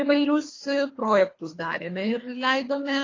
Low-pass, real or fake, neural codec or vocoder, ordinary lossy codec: 7.2 kHz; fake; codec, 24 kHz, 3 kbps, HILCodec; AAC, 32 kbps